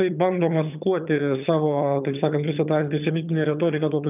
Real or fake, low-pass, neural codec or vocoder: fake; 3.6 kHz; vocoder, 22.05 kHz, 80 mel bands, HiFi-GAN